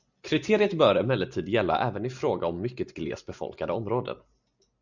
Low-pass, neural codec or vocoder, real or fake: 7.2 kHz; none; real